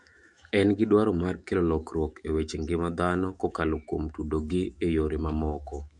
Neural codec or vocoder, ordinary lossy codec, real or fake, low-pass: autoencoder, 48 kHz, 128 numbers a frame, DAC-VAE, trained on Japanese speech; MP3, 64 kbps; fake; 10.8 kHz